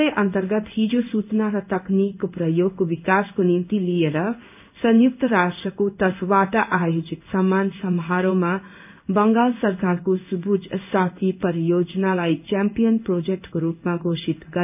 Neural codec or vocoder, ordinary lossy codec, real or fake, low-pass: codec, 16 kHz in and 24 kHz out, 1 kbps, XY-Tokenizer; none; fake; 3.6 kHz